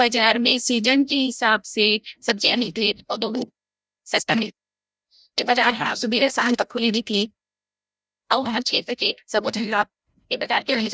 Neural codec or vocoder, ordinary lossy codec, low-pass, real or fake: codec, 16 kHz, 0.5 kbps, FreqCodec, larger model; none; none; fake